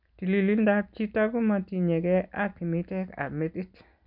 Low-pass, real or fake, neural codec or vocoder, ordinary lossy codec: 5.4 kHz; real; none; none